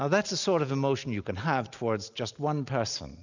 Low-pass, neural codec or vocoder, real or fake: 7.2 kHz; none; real